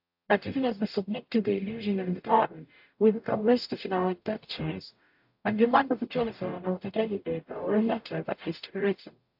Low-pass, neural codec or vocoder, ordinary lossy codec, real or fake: 5.4 kHz; codec, 44.1 kHz, 0.9 kbps, DAC; AAC, 48 kbps; fake